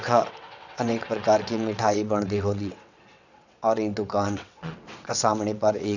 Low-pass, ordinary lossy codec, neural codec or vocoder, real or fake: 7.2 kHz; none; vocoder, 22.05 kHz, 80 mel bands, WaveNeXt; fake